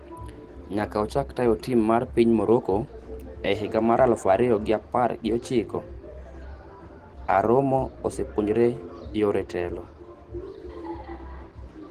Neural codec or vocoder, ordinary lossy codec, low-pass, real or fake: none; Opus, 16 kbps; 14.4 kHz; real